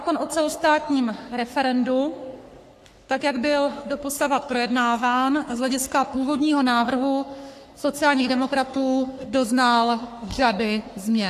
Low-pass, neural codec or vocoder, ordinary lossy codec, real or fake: 14.4 kHz; codec, 44.1 kHz, 3.4 kbps, Pupu-Codec; AAC, 64 kbps; fake